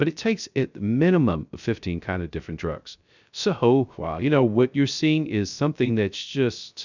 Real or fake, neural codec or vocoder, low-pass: fake; codec, 16 kHz, 0.3 kbps, FocalCodec; 7.2 kHz